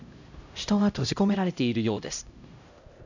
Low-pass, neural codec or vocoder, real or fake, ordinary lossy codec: 7.2 kHz; codec, 16 kHz, 0.5 kbps, X-Codec, HuBERT features, trained on LibriSpeech; fake; none